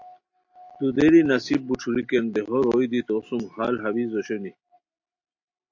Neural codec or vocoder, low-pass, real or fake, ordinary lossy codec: none; 7.2 kHz; real; AAC, 48 kbps